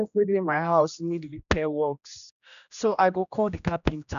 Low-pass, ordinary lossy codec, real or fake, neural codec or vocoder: 7.2 kHz; none; fake; codec, 16 kHz, 1 kbps, X-Codec, HuBERT features, trained on general audio